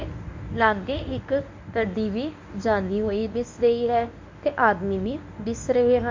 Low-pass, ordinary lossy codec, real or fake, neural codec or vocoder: 7.2 kHz; MP3, 64 kbps; fake; codec, 24 kHz, 0.9 kbps, WavTokenizer, medium speech release version 1